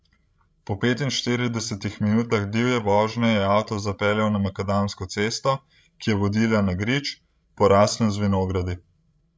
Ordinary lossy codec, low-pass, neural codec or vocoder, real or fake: none; none; codec, 16 kHz, 16 kbps, FreqCodec, larger model; fake